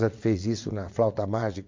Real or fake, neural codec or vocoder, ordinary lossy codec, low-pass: fake; vocoder, 22.05 kHz, 80 mel bands, WaveNeXt; MP3, 48 kbps; 7.2 kHz